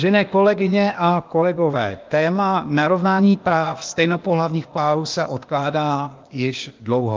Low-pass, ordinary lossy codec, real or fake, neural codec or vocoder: 7.2 kHz; Opus, 24 kbps; fake; codec, 16 kHz, 0.8 kbps, ZipCodec